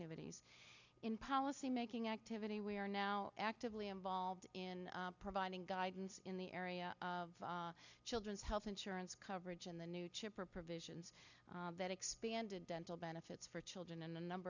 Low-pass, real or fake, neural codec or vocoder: 7.2 kHz; real; none